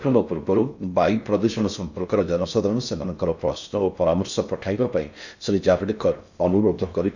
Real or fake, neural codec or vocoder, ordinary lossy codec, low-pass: fake; codec, 16 kHz in and 24 kHz out, 0.6 kbps, FocalCodec, streaming, 4096 codes; none; 7.2 kHz